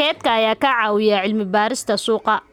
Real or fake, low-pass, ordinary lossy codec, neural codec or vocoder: real; 19.8 kHz; none; none